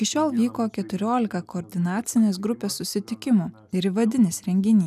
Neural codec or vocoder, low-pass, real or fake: none; 14.4 kHz; real